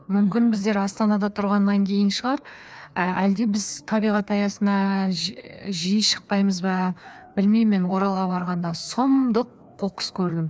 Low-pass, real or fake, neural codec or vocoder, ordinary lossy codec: none; fake; codec, 16 kHz, 2 kbps, FreqCodec, larger model; none